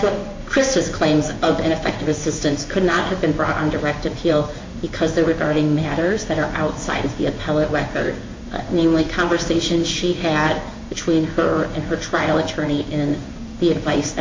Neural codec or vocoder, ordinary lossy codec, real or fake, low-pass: codec, 16 kHz in and 24 kHz out, 1 kbps, XY-Tokenizer; MP3, 48 kbps; fake; 7.2 kHz